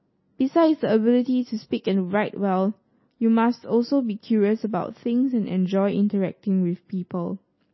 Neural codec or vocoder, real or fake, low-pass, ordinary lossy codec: none; real; 7.2 kHz; MP3, 24 kbps